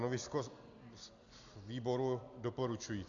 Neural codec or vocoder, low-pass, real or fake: none; 7.2 kHz; real